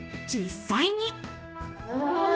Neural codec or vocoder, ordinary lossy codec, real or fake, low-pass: codec, 16 kHz, 1 kbps, X-Codec, HuBERT features, trained on general audio; none; fake; none